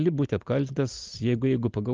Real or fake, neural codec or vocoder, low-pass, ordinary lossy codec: real; none; 7.2 kHz; Opus, 32 kbps